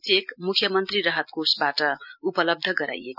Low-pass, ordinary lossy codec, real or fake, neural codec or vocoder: 5.4 kHz; none; real; none